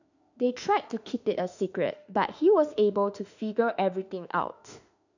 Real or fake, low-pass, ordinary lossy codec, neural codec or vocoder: fake; 7.2 kHz; none; autoencoder, 48 kHz, 32 numbers a frame, DAC-VAE, trained on Japanese speech